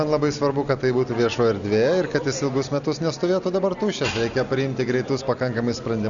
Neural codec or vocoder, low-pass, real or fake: none; 7.2 kHz; real